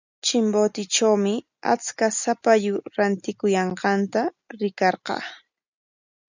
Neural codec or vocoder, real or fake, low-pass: none; real; 7.2 kHz